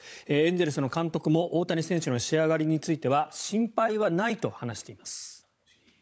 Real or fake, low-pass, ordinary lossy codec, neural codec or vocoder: fake; none; none; codec, 16 kHz, 16 kbps, FunCodec, trained on LibriTTS, 50 frames a second